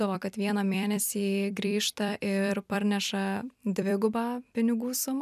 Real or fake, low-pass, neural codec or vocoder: fake; 14.4 kHz; vocoder, 44.1 kHz, 128 mel bands every 256 samples, BigVGAN v2